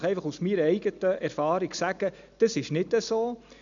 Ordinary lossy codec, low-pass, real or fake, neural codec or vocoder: none; 7.2 kHz; real; none